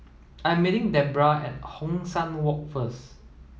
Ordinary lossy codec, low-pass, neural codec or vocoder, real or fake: none; none; none; real